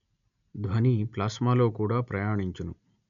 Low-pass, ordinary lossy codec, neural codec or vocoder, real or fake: 7.2 kHz; none; none; real